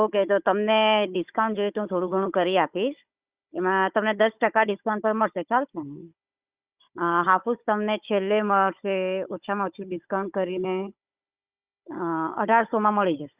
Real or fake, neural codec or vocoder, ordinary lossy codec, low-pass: fake; codec, 16 kHz, 16 kbps, FunCodec, trained on Chinese and English, 50 frames a second; Opus, 64 kbps; 3.6 kHz